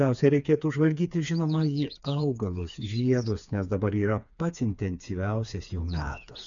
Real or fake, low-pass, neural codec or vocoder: fake; 7.2 kHz; codec, 16 kHz, 4 kbps, FreqCodec, smaller model